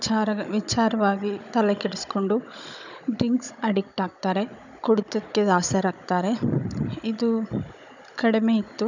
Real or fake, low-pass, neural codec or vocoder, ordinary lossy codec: fake; 7.2 kHz; codec, 16 kHz, 16 kbps, FunCodec, trained on Chinese and English, 50 frames a second; none